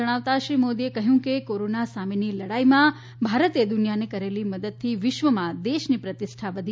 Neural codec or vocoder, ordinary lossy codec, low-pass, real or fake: none; none; none; real